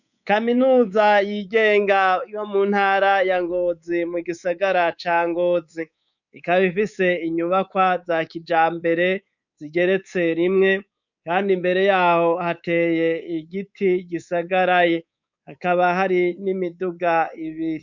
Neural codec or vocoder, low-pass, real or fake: codec, 24 kHz, 3.1 kbps, DualCodec; 7.2 kHz; fake